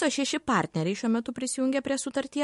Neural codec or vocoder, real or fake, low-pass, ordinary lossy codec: none; real; 14.4 kHz; MP3, 48 kbps